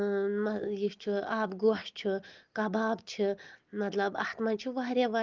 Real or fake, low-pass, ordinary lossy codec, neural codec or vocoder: real; 7.2 kHz; Opus, 32 kbps; none